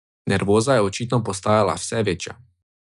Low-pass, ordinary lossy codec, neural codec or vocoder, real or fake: 10.8 kHz; none; none; real